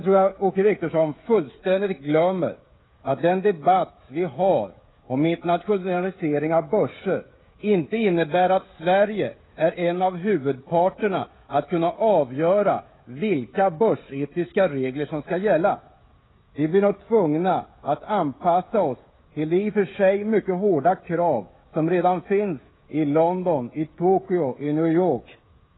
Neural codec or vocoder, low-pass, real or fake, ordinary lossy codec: codec, 16 kHz, 16 kbps, FreqCodec, smaller model; 7.2 kHz; fake; AAC, 16 kbps